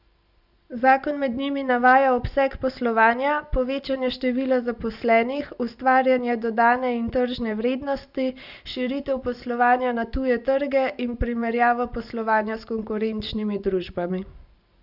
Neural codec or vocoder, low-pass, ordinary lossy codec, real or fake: none; 5.4 kHz; none; real